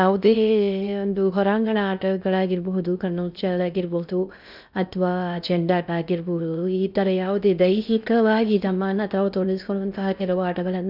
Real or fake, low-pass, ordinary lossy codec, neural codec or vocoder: fake; 5.4 kHz; none; codec, 16 kHz in and 24 kHz out, 0.6 kbps, FocalCodec, streaming, 4096 codes